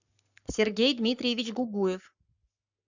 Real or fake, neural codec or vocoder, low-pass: fake; codec, 44.1 kHz, 7.8 kbps, Pupu-Codec; 7.2 kHz